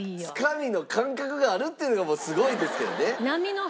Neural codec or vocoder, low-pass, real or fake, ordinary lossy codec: none; none; real; none